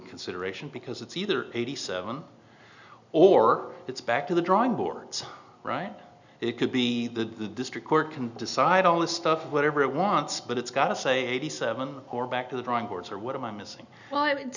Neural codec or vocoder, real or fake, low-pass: none; real; 7.2 kHz